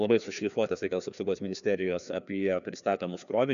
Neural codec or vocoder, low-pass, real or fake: codec, 16 kHz, 2 kbps, FreqCodec, larger model; 7.2 kHz; fake